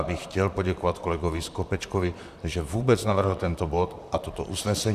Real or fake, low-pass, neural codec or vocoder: fake; 14.4 kHz; vocoder, 44.1 kHz, 128 mel bands, Pupu-Vocoder